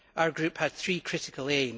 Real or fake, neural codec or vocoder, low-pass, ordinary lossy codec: real; none; none; none